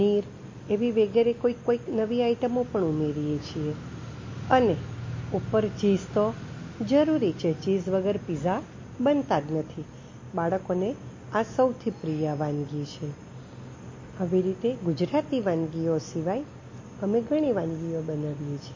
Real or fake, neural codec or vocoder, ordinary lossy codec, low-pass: real; none; MP3, 32 kbps; 7.2 kHz